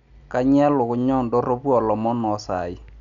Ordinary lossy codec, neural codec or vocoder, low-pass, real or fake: none; none; 7.2 kHz; real